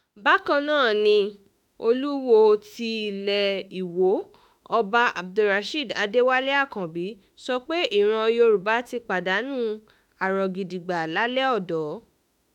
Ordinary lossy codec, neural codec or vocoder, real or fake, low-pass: none; autoencoder, 48 kHz, 32 numbers a frame, DAC-VAE, trained on Japanese speech; fake; 19.8 kHz